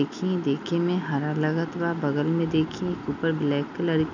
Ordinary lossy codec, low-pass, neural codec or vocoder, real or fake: none; 7.2 kHz; none; real